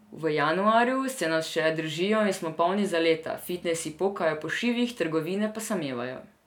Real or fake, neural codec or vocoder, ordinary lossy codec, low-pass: real; none; none; 19.8 kHz